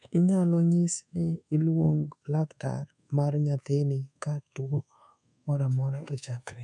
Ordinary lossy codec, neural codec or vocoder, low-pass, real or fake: none; codec, 24 kHz, 1.2 kbps, DualCodec; 10.8 kHz; fake